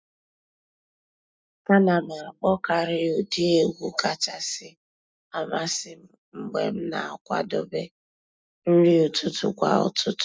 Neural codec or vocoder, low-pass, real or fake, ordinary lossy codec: none; none; real; none